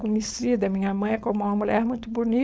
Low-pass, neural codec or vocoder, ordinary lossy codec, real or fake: none; codec, 16 kHz, 4.8 kbps, FACodec; none; fake